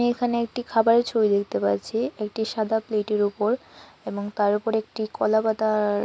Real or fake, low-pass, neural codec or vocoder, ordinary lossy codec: real; none; none; none